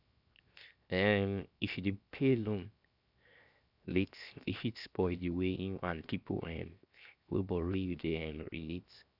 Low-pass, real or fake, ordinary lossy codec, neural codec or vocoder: 5.4 kHz; fake; none; codec, 16 kHz, 0.7 kbps, FocalCodec